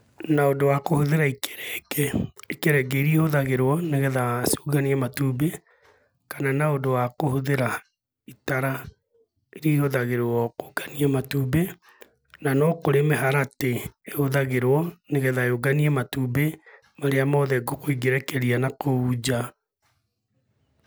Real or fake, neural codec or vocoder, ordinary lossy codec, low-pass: real; none; none; none